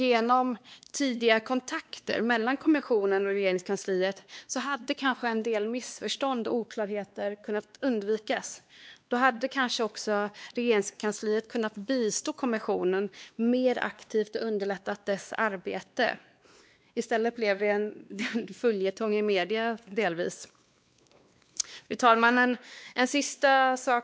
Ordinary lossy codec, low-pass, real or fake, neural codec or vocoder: none; none; fake; codec, 16 kHz, 2 kbps, X-Codec, WavLM features, trained on Multilingual LibriSpeech